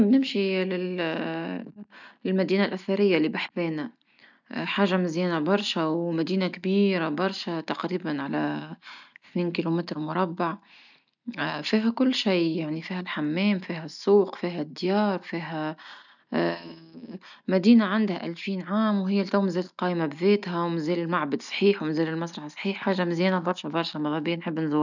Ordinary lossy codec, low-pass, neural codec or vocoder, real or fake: none; 7.2 kHz; none; real